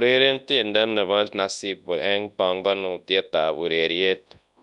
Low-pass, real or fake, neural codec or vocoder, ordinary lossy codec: 10.8 kHz; fake; codec, 24 kHz, 0.9 kbps, WavTokenizer, large speech release; none